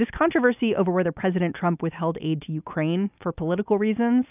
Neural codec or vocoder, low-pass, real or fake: none; 3.6 kHz; real